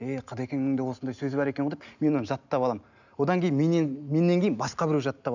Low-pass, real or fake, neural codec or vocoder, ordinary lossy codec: 7.2 kHz; real; none; none